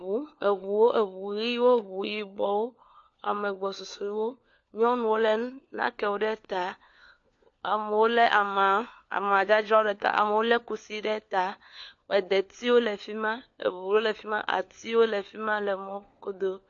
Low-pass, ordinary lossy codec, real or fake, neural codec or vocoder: 7.2 kHz; AAC, 48 kbps; fake; codec, 16 kHz, 4 kbps, FunCodec, trained on LibriTTS, 50 frames a second